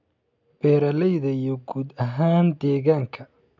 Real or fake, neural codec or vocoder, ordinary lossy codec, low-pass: real; none; none; 7.2 kHz